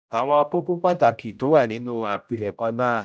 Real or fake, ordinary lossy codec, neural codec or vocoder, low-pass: fake; none; codec, 16 kHz, 0.5 kbps, X-Codec, HuBERT features, trained on general audio; none